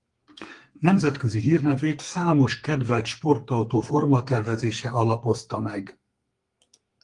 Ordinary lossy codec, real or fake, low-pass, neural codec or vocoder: Opus, 24 kbps; fake; 10.8 kHz; codec, 32 kHz, 1.9 kbps, SNAC